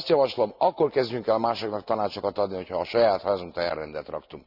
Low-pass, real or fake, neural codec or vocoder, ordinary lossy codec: 5.4 kHz; real; none; none